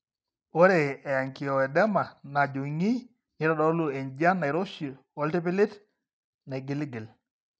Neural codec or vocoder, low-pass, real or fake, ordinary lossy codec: none; none; real; none